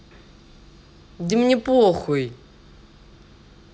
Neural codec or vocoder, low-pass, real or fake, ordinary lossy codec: none; none; real; none